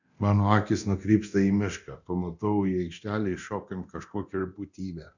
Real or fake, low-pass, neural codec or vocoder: fake; 7.2 kHz; codec, 24 kHz, 0.9 kbps, DualCodec